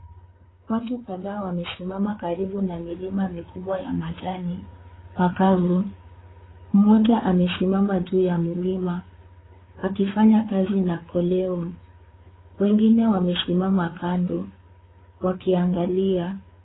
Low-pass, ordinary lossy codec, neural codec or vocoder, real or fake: 7.2 kHz; AAC, 16 kbps; codec, 16 kHz, 4 kbps, FreqCodec, larger model; fake